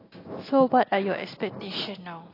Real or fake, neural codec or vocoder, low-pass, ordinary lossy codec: real; none; 5.4 kHz; AAC, 24 kbps